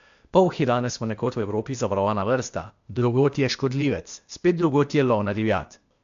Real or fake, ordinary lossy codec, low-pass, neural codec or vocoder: fake; AAC, 64 kbps; 7.2 kHz; codec, 16 kHz, 0.8 kbps, ZipCodec